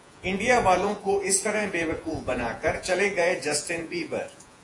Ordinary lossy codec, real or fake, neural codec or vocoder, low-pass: AAC, 48 kbps; fake; vocoder, 48 kHz, 128 mel bands, Vocos; 10.8 kHz